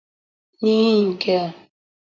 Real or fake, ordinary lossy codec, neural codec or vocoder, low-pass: fake; MP3, 64 kbps; vocoder, 44.1 kHz, 128 mel bands, Pupu-Vocoder; 7.2 kHz